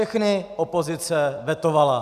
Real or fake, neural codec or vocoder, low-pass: real; none; 14.4 kHz